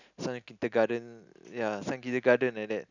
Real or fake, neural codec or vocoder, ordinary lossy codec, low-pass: real; none; none; 7.2 kHz